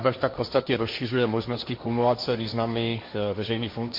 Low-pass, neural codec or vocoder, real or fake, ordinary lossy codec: 5.4 kHz; codec, 16 kHz, 1.1 kbps, Voila-Tokenizer; fake; MP3, 32 kbps